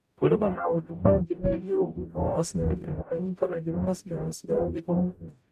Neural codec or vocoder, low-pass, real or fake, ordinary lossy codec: codec, 44.1 kHz, 0.9 kbps, DAC; 14.4 kHz; fake; none